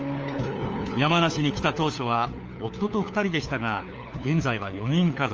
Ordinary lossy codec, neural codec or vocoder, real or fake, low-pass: Opus, 24 kbps; codec, 16 kHz, 4 kbps, FunCodec, trained on LibriTTS, 50 frames a second; fake; 7.2 kHz